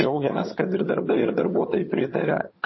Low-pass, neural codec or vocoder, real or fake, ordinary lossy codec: 7.2 kHz; vocoder, 22.05 kHz, 80 mel bands, HiFi-GAN; fake; MP3, 24 kbps